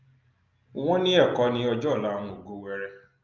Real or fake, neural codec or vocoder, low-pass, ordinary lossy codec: real; none; 7.2 kHz; Opus, 24 kbps